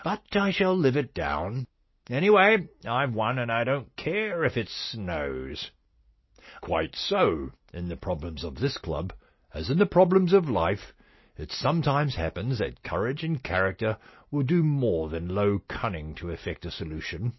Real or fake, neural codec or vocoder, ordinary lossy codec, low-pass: real; none; MP3, 24 kbps; 7.2 kHz